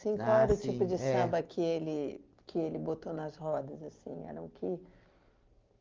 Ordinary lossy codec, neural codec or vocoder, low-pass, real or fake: Opus, 32 kbps; none; 7.2 kHz; real